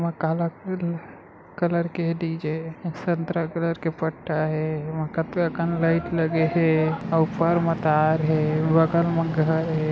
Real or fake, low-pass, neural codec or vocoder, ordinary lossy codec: real; none; none; none